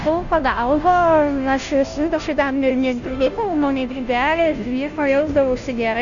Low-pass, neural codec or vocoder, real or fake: 7.2 kHz; codec, 16 kHz, 0.5 kbps, FunCodec, trained on Chinese and English, 25 frames a second; fake